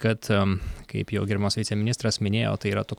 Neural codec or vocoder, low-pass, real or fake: none; 19.8 kHz; real